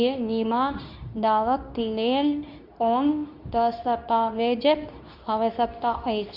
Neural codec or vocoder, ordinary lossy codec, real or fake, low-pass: codec, 24 kHz, 0.9 kbps, WavTokenizer, medium speech release version 2; none; fake; 5.4 kHz